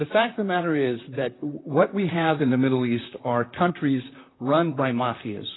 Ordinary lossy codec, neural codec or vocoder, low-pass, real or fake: AAC, 16 kbps; vocoder, 44.1 kHz, 128 mel bands, Pupu-Vocoder; 7.2 kHz; fake